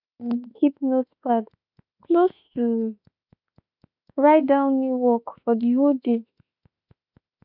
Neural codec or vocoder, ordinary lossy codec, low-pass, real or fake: autoencoder, 48 kHz, 32 numbers a frame, DAC-VAE, trained on Japanese speech; none; 5.4 kHz; fake